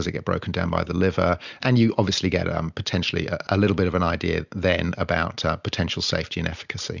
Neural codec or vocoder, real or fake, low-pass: none; real; 7.2 kHz